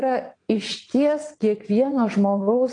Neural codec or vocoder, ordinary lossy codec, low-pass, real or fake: vocoder, 22.05 kHz, 80 mel bands, WaveNeXt; AAC, 48 kbps; 9.9 kHz; fake